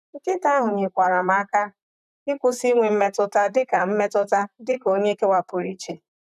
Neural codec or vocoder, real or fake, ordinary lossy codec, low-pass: vocoder, 44.1 kHz, 128 mel bands, Pupu-Vocoder; fake; none; 14.4 kHz